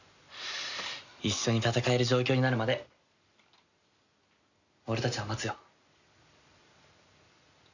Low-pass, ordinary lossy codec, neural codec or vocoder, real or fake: 7.2 kHz; none; none; real